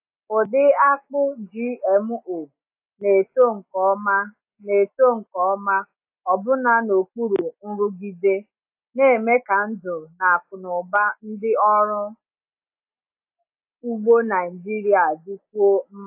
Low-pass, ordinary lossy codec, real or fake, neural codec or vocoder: 3.6 kHz; AAC, 32 kbps; real; none